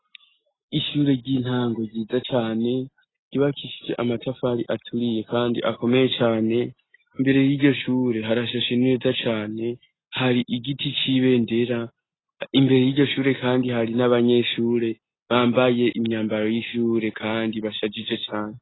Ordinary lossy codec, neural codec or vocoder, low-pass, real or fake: AAC, 16 kbps; none; 7.2 kHz; real